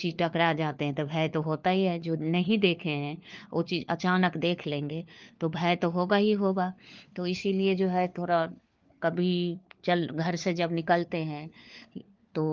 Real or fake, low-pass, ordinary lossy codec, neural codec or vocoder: fake; 7.2 kHz; Opus, 24 kbps; codec, 16 kHz, 4 kbps, FunCodec, trained on LibriTTS, 50 frames a second